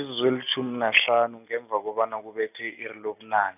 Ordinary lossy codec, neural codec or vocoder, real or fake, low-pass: none; none; real; 3.6 kHz